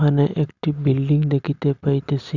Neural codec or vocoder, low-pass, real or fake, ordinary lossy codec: none; 7.2 kHz; real; none